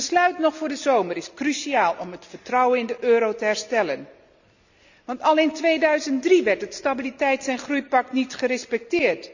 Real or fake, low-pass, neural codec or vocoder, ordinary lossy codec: real; 7.2 kHz; none; none